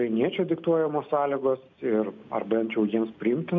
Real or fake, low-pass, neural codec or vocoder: real; 7.2 kHz; none